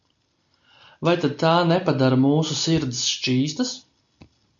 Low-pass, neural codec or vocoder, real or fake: 7.2 kHz; none; real